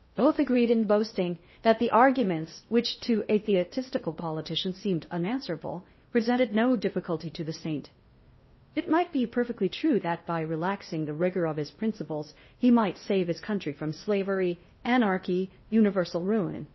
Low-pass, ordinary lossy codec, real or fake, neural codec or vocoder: 7.2 kHz; MP3, 24 kbps; fake; codec, 16 kHz in and 24 kHz out, 0.8 kbps, FocalCodec, streaming, 65536 codes